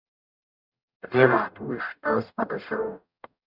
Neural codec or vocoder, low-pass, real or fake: codec, 44.1 kHz, 0.9 kbps, DAC; 5.4 kHz; fake